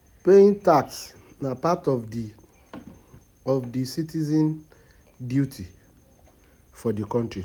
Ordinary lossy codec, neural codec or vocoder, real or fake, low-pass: none; none; real; none